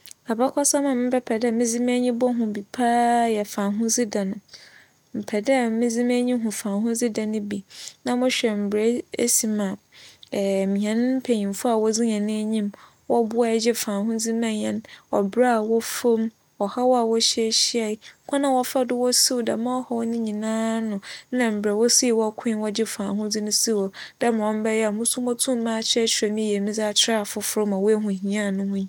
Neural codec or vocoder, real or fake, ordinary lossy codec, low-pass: none; real; none; 19.8 kHz